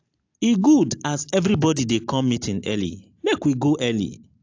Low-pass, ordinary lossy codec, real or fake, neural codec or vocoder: 7.2 kHz; AAC, 48 kbps; real; none